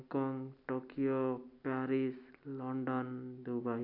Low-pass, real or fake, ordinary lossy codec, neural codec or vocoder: 5.4 kHz; real; none; none